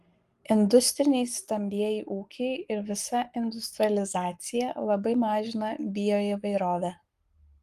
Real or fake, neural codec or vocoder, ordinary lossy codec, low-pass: fake; codec, 44.1 kHz, 7.8 kbps, Pupu-Codec; Opus, 32 kbps; 14.4 kHz